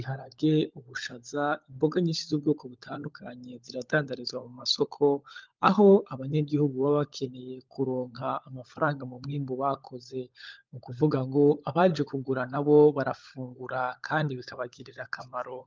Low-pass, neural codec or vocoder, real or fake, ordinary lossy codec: 7.2 kHz; codec, 16 kHz, 16 kbps, FunCodec, trained on LibriTTS, 50 frames a second; fake; Opus, 32 kbps